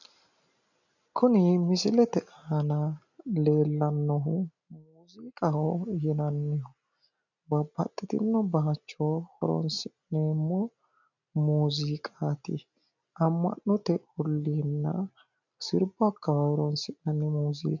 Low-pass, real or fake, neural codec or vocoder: 7.2 kHz; real; none